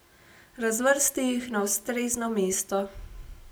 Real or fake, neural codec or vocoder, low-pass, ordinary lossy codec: real; none; none; none